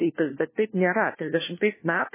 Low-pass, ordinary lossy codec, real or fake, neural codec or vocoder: 3.6 kHz; MP3, 16 kbps; fake; codec, 16 kHz, 1 kbps, FunCodec, trained on LibriTTS, 50 frames a second